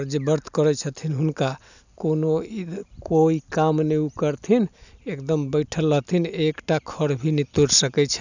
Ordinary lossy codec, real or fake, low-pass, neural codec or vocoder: none; real; 7.2 kHz; none